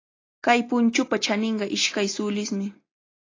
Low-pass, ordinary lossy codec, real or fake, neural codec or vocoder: 7.2 kHz; AAC, 32 kbps; real; none